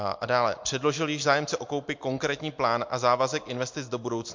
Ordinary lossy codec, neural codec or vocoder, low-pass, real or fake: MP3, 48 kbps; none; 7.2 kHz; real